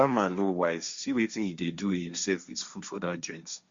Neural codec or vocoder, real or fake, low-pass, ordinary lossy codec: codec, 16 kHz, 1.1 kbps, Voila-Tokenizer; fake; 7.2 kHz; Opus, 64 kbps